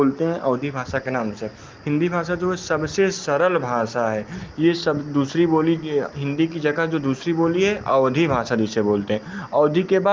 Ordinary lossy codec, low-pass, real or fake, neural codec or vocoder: Opus, 32 kbps; 7.2 kHz; real; none